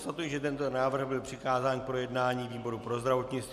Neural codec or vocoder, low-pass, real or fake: none; 14.4 kHz; real